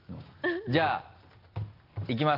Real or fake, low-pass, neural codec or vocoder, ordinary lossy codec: real; 5.4 kHz; none; Opus, 32 kbps